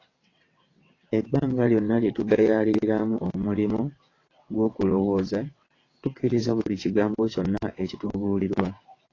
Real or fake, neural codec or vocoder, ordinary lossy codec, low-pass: fake; vocoder, 22.05 kHz, 80 mel bands, WaveNeXt; AAC, 32 kbps; 7.2 kHz